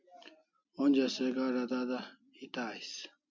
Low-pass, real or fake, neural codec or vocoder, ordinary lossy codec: 7.2 kHz; real; none; MP3, 64 kbps